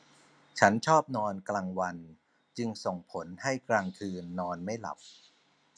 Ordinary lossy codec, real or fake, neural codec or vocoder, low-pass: none; real; none; 9.9 kHz